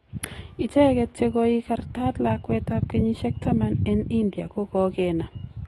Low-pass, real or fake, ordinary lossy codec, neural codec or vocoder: 19.8 kHz; fake; AAC, 32 kbps; autoencoder, 48 kHz, 128 numbers a frame, DAC-VAE, trained on Japanese speech